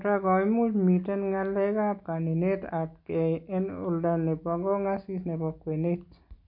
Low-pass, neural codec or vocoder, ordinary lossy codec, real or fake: 5.4 kHz; none; MP3, 48 kbps; real